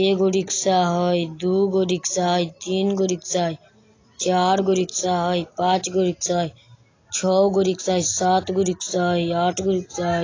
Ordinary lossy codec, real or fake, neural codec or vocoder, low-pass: AAC, 32 kbps; real; none; 7.2 kHz